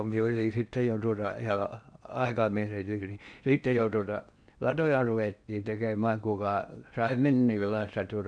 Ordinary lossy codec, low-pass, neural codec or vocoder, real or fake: none; 9.9 kHz; codec, 16 kHz in and 24 kHz out, 0.8 kbps, FocalCodec, streaming, 65536 codes; fake